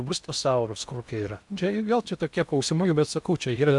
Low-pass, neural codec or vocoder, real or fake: 10.8 kHz; codec, 16 kHz in and 24 kHz out, 0.6 kbps, FocalCodec, streaming, 2048 codes; fake